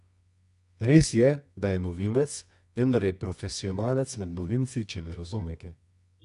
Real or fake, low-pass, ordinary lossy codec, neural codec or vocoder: fake; 10.8 kHz; none; codec, 24 kHz, 0.9 kbps, WavTokenizer, medium music audio release